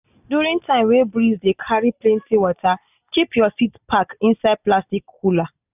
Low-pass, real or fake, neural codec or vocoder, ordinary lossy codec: 3.6 kHz; real; none; none